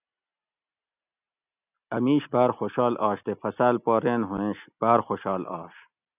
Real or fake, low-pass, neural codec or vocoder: real; 3.6 kHz; none